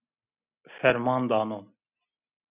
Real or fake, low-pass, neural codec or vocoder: real; 3.6 kHz; none